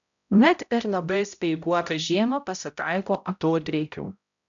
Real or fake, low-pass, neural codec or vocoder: fake; 7.2 kHz; codec, 16 kHz, 0.5 kbps, X-Codec, HuBERT features, trained on balanced general audio